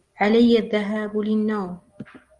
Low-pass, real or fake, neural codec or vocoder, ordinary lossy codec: 10.8 kHz; real; none; Opus, 24 kbps